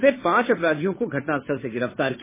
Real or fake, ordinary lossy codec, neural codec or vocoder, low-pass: fake; MP3, 16 kbps; codec, 16 kHz, 2 kbps, FunCodec, trained on Chinese and English, 25 frames a second; 3.6 kHz